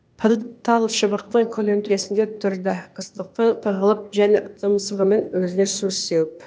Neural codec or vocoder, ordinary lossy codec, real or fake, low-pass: codec, 16 kHz, 0.8 kbps, ZipCodec; none; fake; none